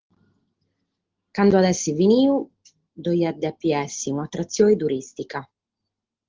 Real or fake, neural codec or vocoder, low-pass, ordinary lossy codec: real; none; 7.2 kHz; Opus, 16 kbps